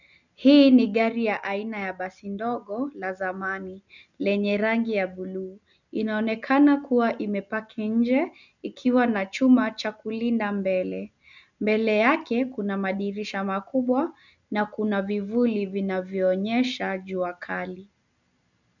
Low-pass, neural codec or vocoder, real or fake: 7.2 kHz; none; real